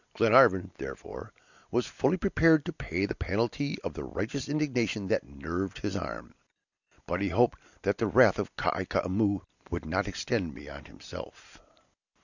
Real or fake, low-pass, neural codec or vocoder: real; 7.2 kHz; none